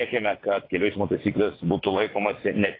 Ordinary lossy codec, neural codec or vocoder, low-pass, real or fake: AAC, 24 kbps; vocoder, 22.05 kHz, 80 mel bands, WaveNeXt; 5.4 kHz; fake